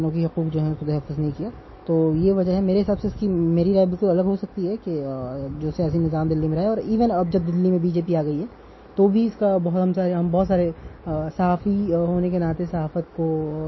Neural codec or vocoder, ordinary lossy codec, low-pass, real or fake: none; MP3, 24 kbps; 7.2 kHz; real